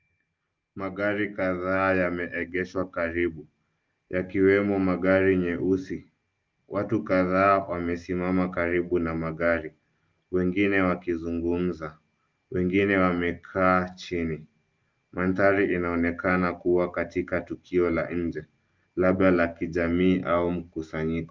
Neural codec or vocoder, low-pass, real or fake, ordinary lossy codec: none; 7.2 kHz; real; Opus, 24 kbps